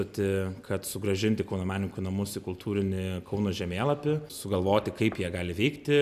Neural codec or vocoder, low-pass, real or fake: none; 14.4 kHz; real